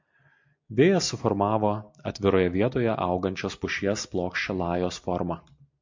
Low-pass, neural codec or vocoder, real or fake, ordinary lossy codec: 7.2 kHz; none; real; MP3, 48 kbps